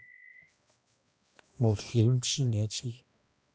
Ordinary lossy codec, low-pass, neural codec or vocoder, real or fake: none; none; codec, 16 kHz, 1 kbps, X-Codec, HuBERT features, trained on balanced general audio; fake